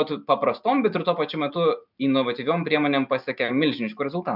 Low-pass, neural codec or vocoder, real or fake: 5.4 kHz; none; real